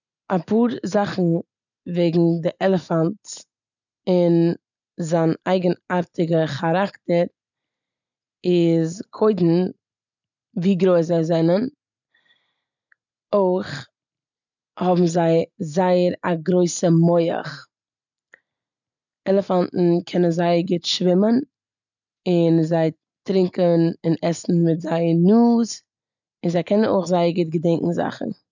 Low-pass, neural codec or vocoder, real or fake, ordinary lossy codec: 7.2 kHz; none; real; none